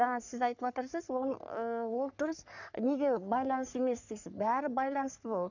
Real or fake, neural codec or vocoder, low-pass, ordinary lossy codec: fake; codec, 44.1 kHz, 3.4 kbps, Pupu-Codec; 7.2 kHz; none